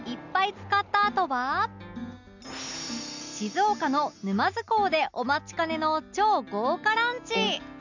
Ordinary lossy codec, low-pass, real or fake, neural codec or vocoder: none; 7.2 kHz; real; none